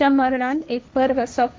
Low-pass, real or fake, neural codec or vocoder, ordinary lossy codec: none; fake; codec, 16 kHz, 1.1 kbps, Voila-Tokenizer; none